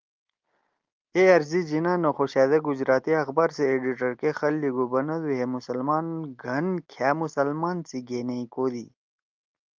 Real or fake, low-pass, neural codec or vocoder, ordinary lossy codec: real; 7.2 kHz; none; Opus, 24 kbps